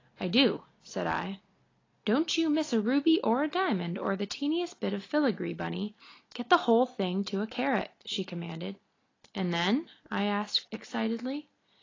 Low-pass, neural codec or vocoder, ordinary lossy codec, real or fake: 7.2 kHz; none; AAC, 32 kbps; real